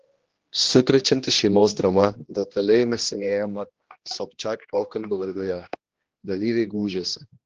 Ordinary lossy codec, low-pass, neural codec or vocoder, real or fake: Opus, 16 kbps; 7.2 kHz; codec, 16 kHz, 1 kbps, X-Codec, HuBERT features, trained on general audio; fake